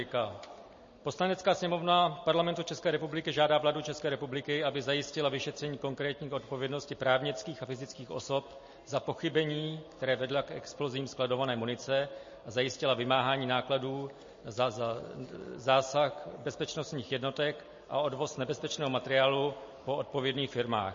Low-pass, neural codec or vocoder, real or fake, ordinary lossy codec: 7.2 kHz; none; real; MP3, 32 kbps